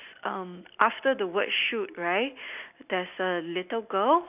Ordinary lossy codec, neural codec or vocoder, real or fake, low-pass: none; none; real; 3.6 kHz